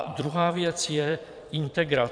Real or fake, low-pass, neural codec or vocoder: fake; 9.9 kHz; vocoder, 22.05 kHz, 80 mel bands, Vocos